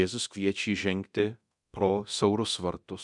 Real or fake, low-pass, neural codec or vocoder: fake; 10.8 kHz; codec, 24 kHz, 0.9 kbps, DualCodec